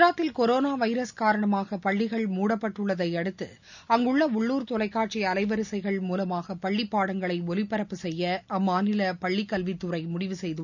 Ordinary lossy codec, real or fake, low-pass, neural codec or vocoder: none; real; 7.2 kHz; none